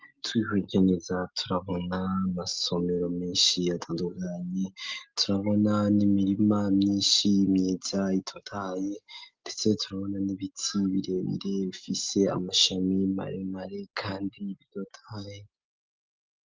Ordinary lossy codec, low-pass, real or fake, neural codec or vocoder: Opus, 32 kbps; 7.2 kHz; real; none